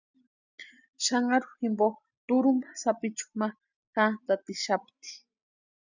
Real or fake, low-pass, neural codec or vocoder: real; 7.2 kHz; none